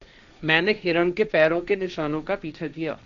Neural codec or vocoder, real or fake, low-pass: codec, 16 kHz, 1.1 kbps, Voila-Tokenizer; fake; 7.2 kHz